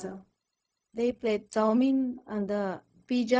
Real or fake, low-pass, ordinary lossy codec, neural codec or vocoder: fake; none; none; codec, 16 kHz, 0.4 kbps, LongCat-Audio-Codec